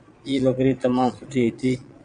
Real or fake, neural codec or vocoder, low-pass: fake; vocoder, 22.05 kHz, 80 mel bands, Vocos; 9.9 kHz